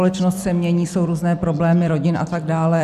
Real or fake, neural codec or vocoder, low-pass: real; none; 14.4 kHz